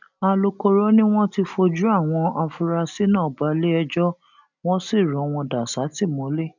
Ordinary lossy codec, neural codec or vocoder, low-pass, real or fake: none; none; 7.2 kHz; real